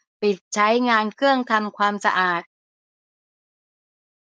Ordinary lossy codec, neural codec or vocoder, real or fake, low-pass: none; codec, 16 kHz, 4.8 kbps, FACodec; fake; none